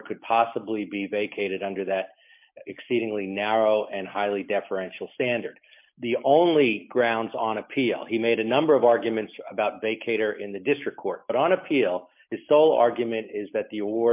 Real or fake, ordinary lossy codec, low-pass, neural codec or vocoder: real; MP3, 32 kbps; 3.6 kHz; none